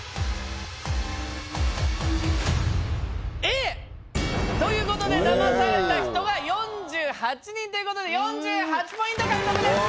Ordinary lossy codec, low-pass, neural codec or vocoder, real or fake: none; none; none; real